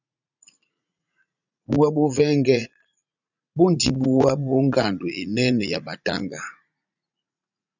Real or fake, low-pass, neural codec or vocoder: fake; 7.2 kHz; vocoder, 44.1 kHz, 80 mel bands, Vocos